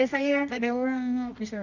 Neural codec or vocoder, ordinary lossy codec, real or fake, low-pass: codec, 24 kHz, 0.9 kbps, WavTokenizer, medium music audio release; none; fake; 7.2 kHz